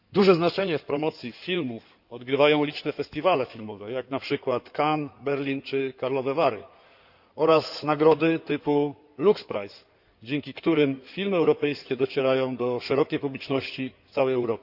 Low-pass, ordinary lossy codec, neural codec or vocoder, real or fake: 5.4 kHz; none; codec, 16 kHz in and 24 kHz out, 2.2 kbps, FireRedTTS-2 codec; fake